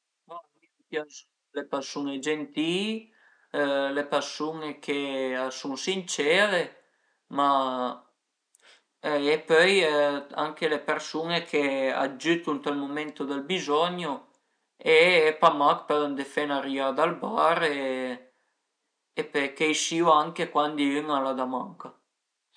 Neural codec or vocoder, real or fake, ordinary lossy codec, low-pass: none; real; none; 9.9 kHz